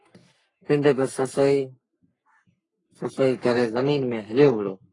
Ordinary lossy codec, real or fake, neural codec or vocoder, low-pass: AAC, 32 kbps; fake; codec, 44.1 kHz, 3.4 kbps, Pupu-Codec; 10.8 kHz